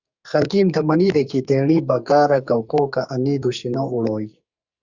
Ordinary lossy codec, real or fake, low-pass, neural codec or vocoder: Opus, 64 kbps; fake; 7.2 kHz; codec, 44.1 kHz, 2.6 kbps, SNAC